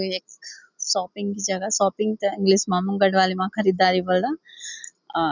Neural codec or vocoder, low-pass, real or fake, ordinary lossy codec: none; 7.2 kHz; real; none